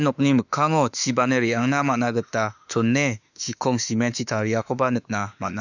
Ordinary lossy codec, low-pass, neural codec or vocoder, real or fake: none; 7.2 kHz; autoencoder, 48 kHz, 32 numbers a frame, DAC-VAE, trained on Japanese speech; fake